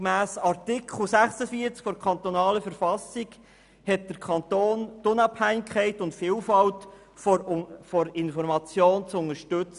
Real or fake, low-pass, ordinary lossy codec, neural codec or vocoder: real; 10.8 kHz; none; none